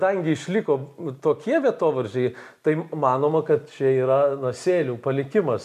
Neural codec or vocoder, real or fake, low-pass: none; real; 14.4 kHz